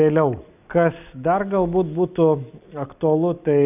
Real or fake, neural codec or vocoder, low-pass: real; none; 3.6 kHz